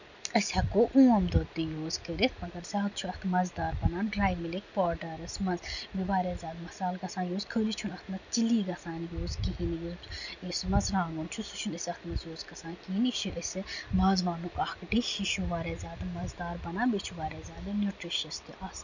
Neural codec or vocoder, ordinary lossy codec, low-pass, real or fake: none; none; 7.2 kHz; real